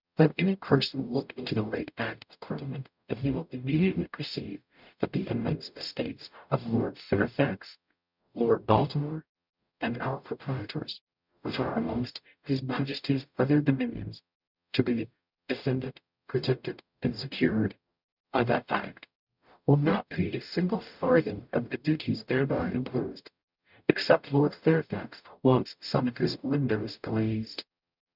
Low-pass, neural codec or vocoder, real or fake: 5.4 kHz; codec, 44.1 kHz, 0.9 kbps, DAC; fake